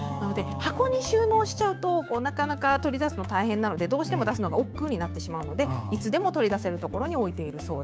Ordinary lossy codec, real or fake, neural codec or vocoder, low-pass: none; fake; codec, 16 kHz, 6 kbps, DAC; none